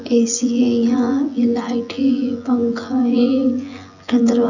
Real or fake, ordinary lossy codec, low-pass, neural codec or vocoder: fake; none; 7.2 kHz; vocoder, 24 kHz, 100 mel bands, Vocos